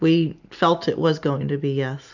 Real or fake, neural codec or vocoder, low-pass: real; none; 7.2 kHz